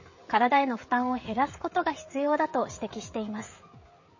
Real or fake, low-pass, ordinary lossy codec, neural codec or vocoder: fake; 7.2 kHz; MP3, 32 kbps; codec, 16 kHz, 16 kbps, FreqCodec, smaller model